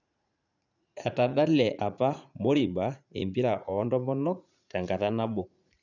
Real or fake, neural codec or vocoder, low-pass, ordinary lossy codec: fake; vocoder, 44.1 kHz, 80 mel bands, Vocos; 7.2 kHz; none